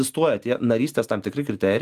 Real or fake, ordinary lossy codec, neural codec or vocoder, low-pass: real; Opus, 32 kbps; none; 14.4 kHz